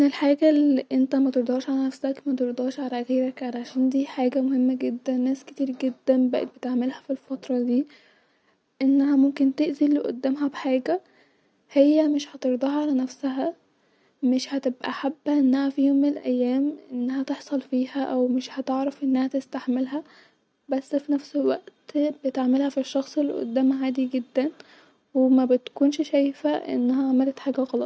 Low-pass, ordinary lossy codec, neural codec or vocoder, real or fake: none; none; none; real